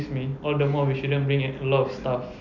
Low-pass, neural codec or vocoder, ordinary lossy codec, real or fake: 7.2 kHz; none; none; real